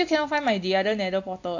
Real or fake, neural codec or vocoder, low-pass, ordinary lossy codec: real; none; 7.2 kHz; AAC, 48 kbps